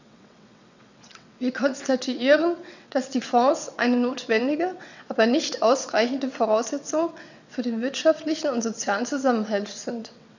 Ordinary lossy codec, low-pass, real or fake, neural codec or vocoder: none; 7.2 kHz; fake; vocoder, 22.05 kHz, 80 mel bands, WaveNeXt